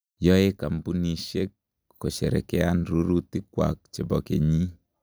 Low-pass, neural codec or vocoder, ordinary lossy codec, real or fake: none; none; none; real